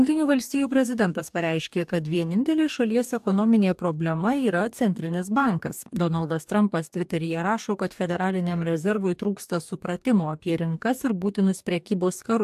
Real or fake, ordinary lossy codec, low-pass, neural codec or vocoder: fake; AAC, 96 kbps; 14.4 kHz; codec, 44.1 kHz, 2.6 kbps, DAC